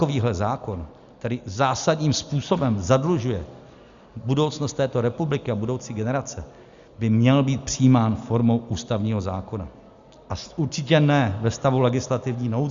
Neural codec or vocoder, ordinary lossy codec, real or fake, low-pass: none; Opus, 64 kbps; real; 7.2 kHz